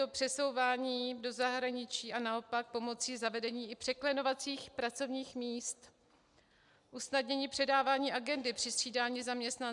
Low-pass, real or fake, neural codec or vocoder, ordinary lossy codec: 10.8 kHz; real; none; MP3, 96 kbps